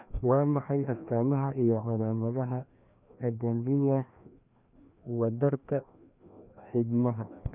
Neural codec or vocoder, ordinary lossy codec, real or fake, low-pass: codec, 16 kHz, 1 kbps, FreqCodec, larger model; none; fake; 3.6 kHz